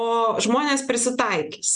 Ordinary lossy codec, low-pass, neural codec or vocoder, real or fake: Opus, 64 kbps; 9.9 kHz; none; real